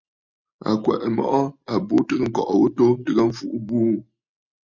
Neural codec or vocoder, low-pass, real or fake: none; 7.2 kHz; real